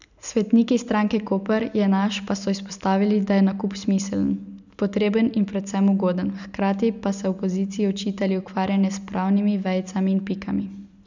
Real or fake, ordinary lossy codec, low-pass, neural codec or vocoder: real; none; 7.2 kHz; none